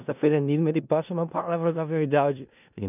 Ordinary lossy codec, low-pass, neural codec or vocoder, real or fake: none; 3.6 kHz; codec, 16 kHz in and 24 kHz out, 0.4 kbps, LongCat-Audio-Codec, four codebook decoder; fake